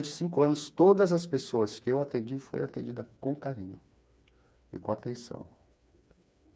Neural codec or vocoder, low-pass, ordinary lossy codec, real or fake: codec, 16 kHz, 4 kbps, FreqCodec, smaller model; none; none; fake